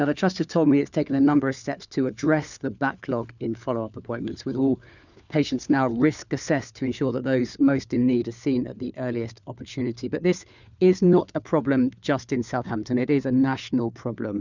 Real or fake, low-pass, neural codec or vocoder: fake; 7.2 kHz; codec, 16 kHz, 4 kbps, FunCodec, trained on LibriTTS, 50 frames a second